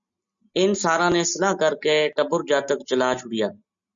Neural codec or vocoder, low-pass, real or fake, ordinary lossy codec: none; 7.2 kHz; real; MP3, 96 kbps